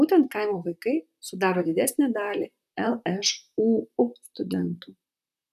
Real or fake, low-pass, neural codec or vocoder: fake; 14.4 kHz; vocoder, 44.1 kHz, 128 mel bands, Pupu-Vocoder